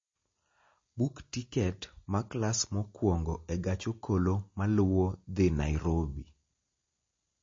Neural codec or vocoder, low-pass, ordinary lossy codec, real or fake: none; 7.2 kHz; MP3, 32 kbps; real